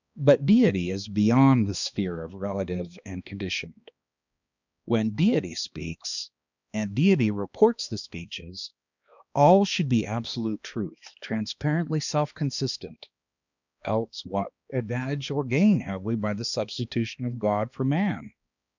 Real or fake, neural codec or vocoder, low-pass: fake; codec, 16 kHz, 2 kbps, X-Codec, HuBERT features, trained on balanced general audio; 7.2 kHz